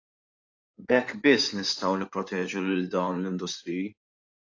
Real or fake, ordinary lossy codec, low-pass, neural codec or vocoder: fake; AAC, 48 kbps; 7.2 kHz; codec, 16 kHz in and 24 kHz out, 2.2 kbps, FireRedTTS-2 codec